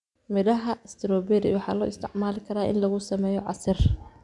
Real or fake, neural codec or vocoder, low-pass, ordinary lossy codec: real; none; 10.8 kHz; none